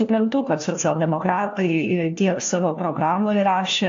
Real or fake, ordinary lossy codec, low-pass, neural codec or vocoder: fake; AAC, 48 kbps; 7.2 kHz; codec, 16 kHz, 1 kbps, FunCodec, trained on LibriTTS, 50 frames a second